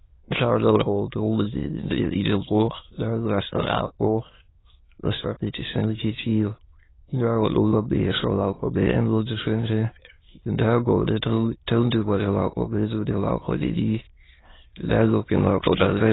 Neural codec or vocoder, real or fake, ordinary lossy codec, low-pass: autoencoder, 22.05 kHz, a latent of 192 numbers a frame, VITS, trained on many speakers; fake; AAC, 16 kbps; 7.2 kHz